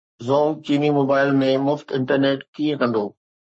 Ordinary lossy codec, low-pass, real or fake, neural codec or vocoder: MP3, 32 kbps; 9.9 kHz; fake; codec, 44.1 kHz, 3.4 kbps, Pupu-Codec